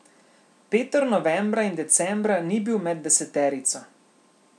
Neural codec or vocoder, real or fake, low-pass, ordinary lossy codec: none; real; none; none